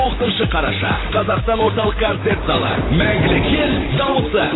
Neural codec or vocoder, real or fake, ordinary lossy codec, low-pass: vocoder, 44.1 kHz, 128 mel bands, Pupu-Vocoder; fake; AAC, 16 kbps; 7.2 kHz